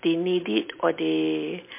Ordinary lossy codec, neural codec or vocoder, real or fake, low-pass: MP3, 24 kbps; none; real; 3.6 kHz